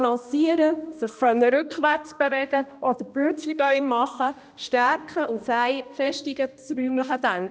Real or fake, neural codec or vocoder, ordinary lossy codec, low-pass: fake; codec, 16 kHz, 1 kbps, X-Codec, HuBERT features, trained on balanced general audio; none; none